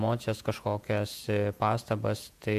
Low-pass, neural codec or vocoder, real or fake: 14.4 kHz; vocoder, 48 kHz, 128 mel bands, Vocos; fake